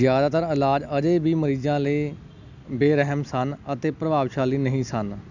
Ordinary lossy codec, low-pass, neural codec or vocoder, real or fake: none; 7.2 kHz; none; real